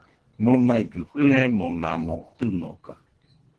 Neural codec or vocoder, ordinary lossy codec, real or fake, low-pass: codec, 24 kHz, 1.5 kbps, HILCodec; Opus, 16 kbps; fake; 10.8 kHz